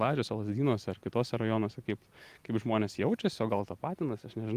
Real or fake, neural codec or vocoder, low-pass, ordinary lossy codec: real; none; 14.4 kHz; Opus, 32 kbps